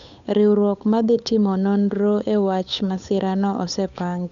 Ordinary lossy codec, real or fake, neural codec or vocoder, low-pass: none; fake; codec, 16 kHz, 8 kbps, FunCodec, trained on Chinese and English, 25 frames a second; 7.2 kHz